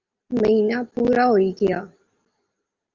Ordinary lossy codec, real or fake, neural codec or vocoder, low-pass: Opus, 32 kbps; real; none; 7.2 kHz